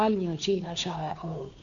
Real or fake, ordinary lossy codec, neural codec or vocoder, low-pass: fake; none; codec, 16 kHz, 2 kbps, FunCodec, trained on Chinese and English, 25 frames a second; 7.2 kHz